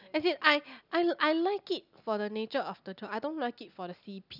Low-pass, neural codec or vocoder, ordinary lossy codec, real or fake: 5.4 kHz; none; none; real